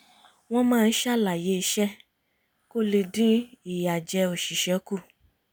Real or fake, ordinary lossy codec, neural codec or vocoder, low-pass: real; none; none; none